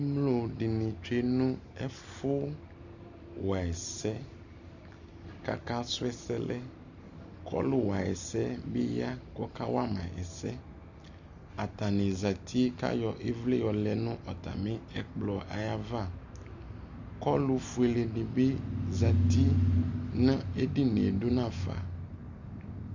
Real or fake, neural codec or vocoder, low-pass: real; none; 7.2 kHz